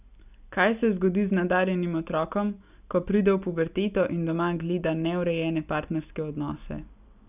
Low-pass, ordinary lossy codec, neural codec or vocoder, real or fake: 3.6 kHz; none; none; real